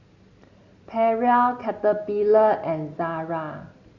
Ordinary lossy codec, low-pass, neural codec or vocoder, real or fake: Opus, 64 kbps; 7.2 kHz; none; real